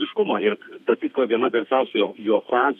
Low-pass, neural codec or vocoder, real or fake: 14.4 kHz; codec, 32 kHz, 1.9 kbps, SNAC; fake